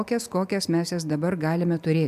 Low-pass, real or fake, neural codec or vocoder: 14.4 kHz; real; none